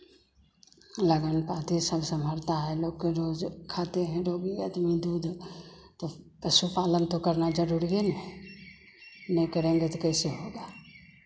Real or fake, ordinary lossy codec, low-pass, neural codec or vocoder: real; none; none; none